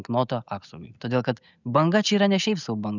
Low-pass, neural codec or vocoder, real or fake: 7.2 kHz; none; real